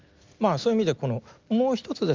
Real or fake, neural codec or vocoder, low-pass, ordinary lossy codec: real; none; 7.2 kHz; Opus, 32 kbps